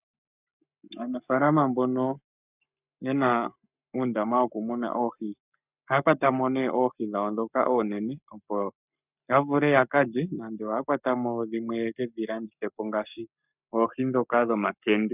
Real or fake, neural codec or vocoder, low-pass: fake; codec, 44.1 kHz, 7.8 kbps, Pupu-Codec; 3.6 kHz